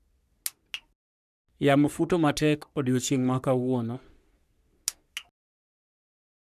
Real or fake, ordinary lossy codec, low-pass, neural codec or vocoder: fake; none; 14.4 kHz; codec, 44.1 kHz, 3.4 kbps, Pupu-Codec